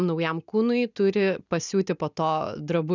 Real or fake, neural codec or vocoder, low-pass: real; none; 7.2 kHz